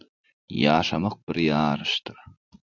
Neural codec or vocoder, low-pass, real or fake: none; 7.2 kHz; real